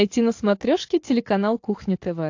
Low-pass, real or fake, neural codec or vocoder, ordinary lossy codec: 7.2 kHz; real; none; AAC, 48 kbps